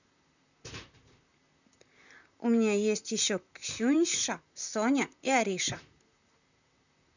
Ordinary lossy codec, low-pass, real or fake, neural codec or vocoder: none; 7.2 kHz; fake; vocoder, 44.1 kHz, 128 mel bands, Pupu-Vocoder